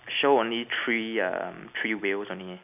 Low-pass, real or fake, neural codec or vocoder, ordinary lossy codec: 3.6 kHz; real; none; none